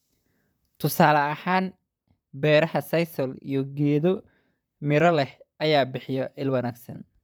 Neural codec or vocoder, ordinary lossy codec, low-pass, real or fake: vocoder, 44.1 kHz, 128 mel bands, Pupu-Vocoder; none; none; fake